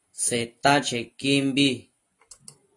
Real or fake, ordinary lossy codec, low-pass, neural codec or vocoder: real; AAC, 32 kbps; 10.8 kHz; none